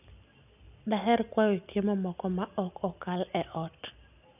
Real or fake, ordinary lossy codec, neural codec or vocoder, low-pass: real; none; none; 3.6 kHz